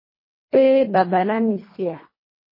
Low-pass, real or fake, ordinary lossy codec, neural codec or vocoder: 5.4 kHz; fake; MP3, 24 kbps; codec, 24 kHz, 1.5 kbps, HILCodec